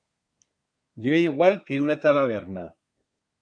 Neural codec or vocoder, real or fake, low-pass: codec, 24 kHz, 1 kbps, SNAC; fake; 9.9 kHz